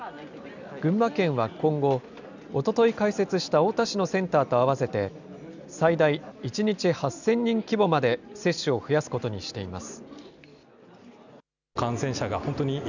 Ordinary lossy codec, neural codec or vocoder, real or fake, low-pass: none; none; real; 7.2 kHz